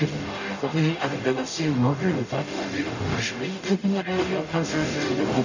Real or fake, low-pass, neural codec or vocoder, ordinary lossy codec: fake; 7.2 kHz; codec, 44.1 kHz, 0.9 kbps, DAC; none